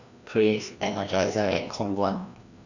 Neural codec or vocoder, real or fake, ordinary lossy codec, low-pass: codec, 16 kHz, 1 kbps, FreqCodec, larger model; fake; none; 7.2 kHz